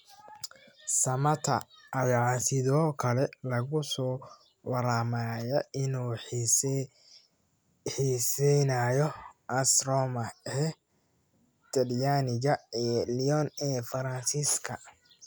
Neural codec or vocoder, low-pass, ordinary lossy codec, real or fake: none; none; none; real